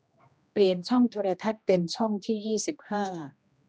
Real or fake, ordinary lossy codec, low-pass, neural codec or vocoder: fake; none; none; codec, 16 kHz, 1 kbps, X-Codec, HuBERT features, trained on general audio